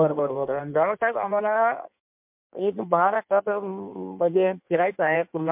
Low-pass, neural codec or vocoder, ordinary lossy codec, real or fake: 3.6 kHz; codec, 16 kHz in and 24 kHz out, 1.1 kbps, FireRedTTS-2 codec; MP3, 32 kbps; fake